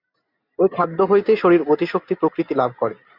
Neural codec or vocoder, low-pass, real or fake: none; 5.4 kHz; real